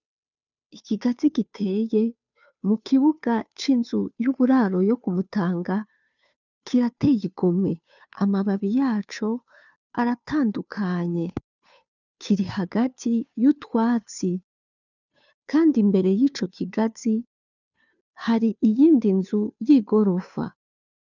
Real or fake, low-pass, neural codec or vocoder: fake; 7.2 kHz; codec, 16 kHz, 2 kbps, FunCodec, trained on Chinese and English, 25 frames a second